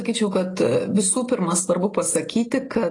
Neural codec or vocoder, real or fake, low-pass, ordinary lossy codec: none; real; 10.8 kHz; AAC, 32 kbps